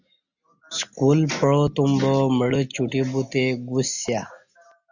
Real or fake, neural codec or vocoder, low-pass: real; none; 7.2 kHz